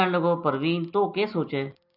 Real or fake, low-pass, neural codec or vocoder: real; 5.4 kHz; none